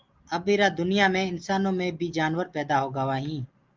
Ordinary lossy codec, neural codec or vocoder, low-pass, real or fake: Opus, 32 kbps; none; 7.2 kHz; real